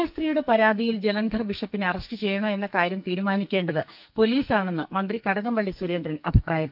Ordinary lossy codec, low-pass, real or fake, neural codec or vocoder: none; 5.4 kHz; fake; codec, 44.1 kHz, 2.6 kbps, SNAC